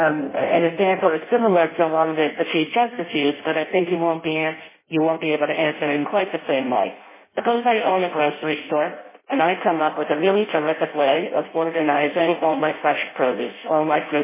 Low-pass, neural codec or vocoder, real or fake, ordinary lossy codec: 3.6 kHz; codec, 16 kHz in and 24 kHz out, 0.6 kbps, FireRedTTS-2 codec; fake; MP3, 16 kbps